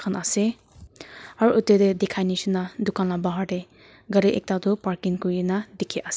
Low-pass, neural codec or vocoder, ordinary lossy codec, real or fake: none; none; none; real